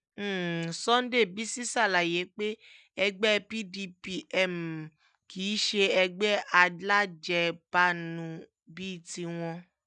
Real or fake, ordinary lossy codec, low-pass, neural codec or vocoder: real; none; 9.9 kHz; none